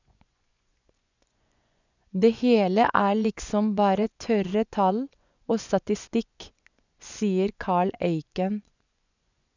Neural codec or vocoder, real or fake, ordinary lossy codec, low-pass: vocoder, 24 kHz, 100 mel bands, Vocos; fake; none; 7.2 kHz